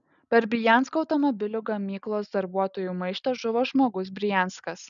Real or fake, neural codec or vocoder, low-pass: real; none; 7.2 kHz